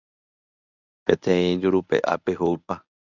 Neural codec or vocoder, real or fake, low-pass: codec, 24 kHz, 0.9 kbps, WavTokenizer, medium speech release version 1; fake; 7.2 kHz